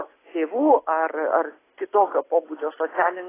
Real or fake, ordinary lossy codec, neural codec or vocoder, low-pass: real; AAC, 16 kbps; none; 3.6 kHz